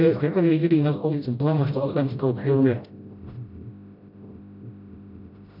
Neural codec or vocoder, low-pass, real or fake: codec, 16 kHz, 0.5 kbps, FreqCodec, smaller model; 5.4 kHz; fake